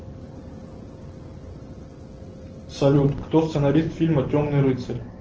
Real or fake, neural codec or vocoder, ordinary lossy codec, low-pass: real; none; Opus, 16 kbps; 7.2 kHz